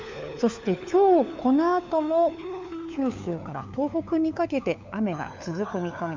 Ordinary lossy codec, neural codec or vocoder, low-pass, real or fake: MP3, 64 kbps; codec, 16 kHz, 4 kbps, FunCodec, trained on LibriTTS, 50 frames a second; 7.2 kHz; fake